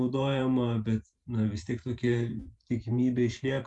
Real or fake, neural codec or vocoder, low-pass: fake; vocoder, 44.1 kHz, 128 mel bands every 512 samples, BigVGAN v2; 10.8 kHz